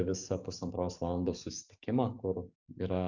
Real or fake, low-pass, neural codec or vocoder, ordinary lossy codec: fake; 7.2 kHz; codec, 44.1 kHz, 7.8 kbps, DAC; Opus, 64 kbps